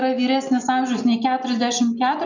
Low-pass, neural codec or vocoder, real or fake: 7.2 kHz; none; real